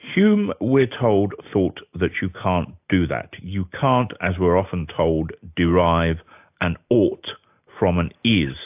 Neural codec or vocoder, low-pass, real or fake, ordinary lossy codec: none; 3.6 kHz; real; AAC, 32 kbps